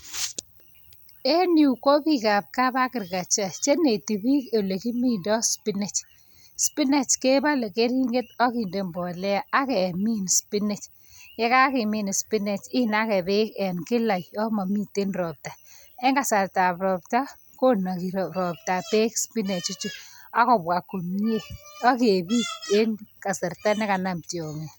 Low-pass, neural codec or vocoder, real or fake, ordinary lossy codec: none; none; real; none